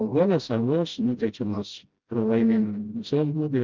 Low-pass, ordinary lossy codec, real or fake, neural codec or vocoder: 7.2 kHz; Opus, 24 kbps; fake; codec, 16 kHz, 0.5 kbps, FreqCodec, smaller model